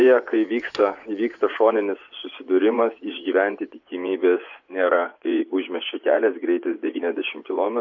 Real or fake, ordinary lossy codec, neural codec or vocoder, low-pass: fake; AAC, 48 kbps; vocoder, 24 kHz, 100 mel bands, Vocos; 7.2 kHz